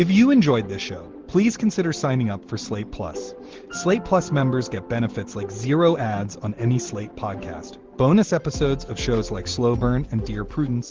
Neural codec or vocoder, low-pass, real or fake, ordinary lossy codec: none; 7.2 kHz; real; Opus, 16 kbps